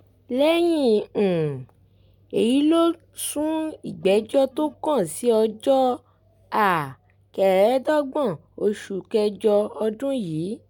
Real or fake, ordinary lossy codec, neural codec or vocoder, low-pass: real; none; none; 19.8 kHz